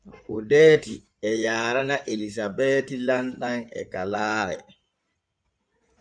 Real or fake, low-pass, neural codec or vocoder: fake; 9.9 kHz; codec, 16 kHz in and 24 kHz out, 2.2 kbps, FireRedTTS-2 codec